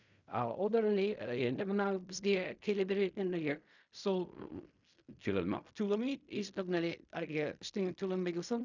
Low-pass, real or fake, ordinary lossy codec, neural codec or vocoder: 7.2 kHz; fake; none; codec, 16 kHz in and 24 kHz out, 0.4 kbps, LongCat-Audio-Codec, fine tuned four codebook decoder